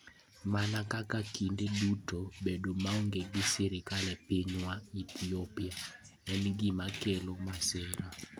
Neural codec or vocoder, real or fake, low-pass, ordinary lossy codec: none; real; none; none